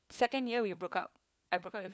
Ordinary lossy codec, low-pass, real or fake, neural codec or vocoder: none; none; fake; codec, 16 kHz, 4 kbps, FunCodec, trained on LibriTTS, 50 frames a second